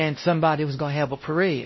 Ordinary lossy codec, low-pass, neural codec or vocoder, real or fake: MP3, 24 kbps; 7.2 kHz; codec, 16 kHz, 0.5 kbps, X-Codec, WavLM features, trained on Multilingual LibriSpeech; fake